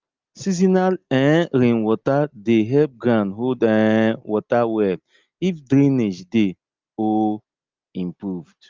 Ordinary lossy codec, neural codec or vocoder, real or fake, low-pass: Opus, 32 kbps; none; real; 7.2 kHz